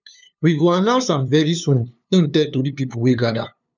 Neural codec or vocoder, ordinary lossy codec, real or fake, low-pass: codec, 16 kHz, 2 kbps, FunCodec, trained on LibriTTS, 25 frames a second; none; fake; 7.2 kHz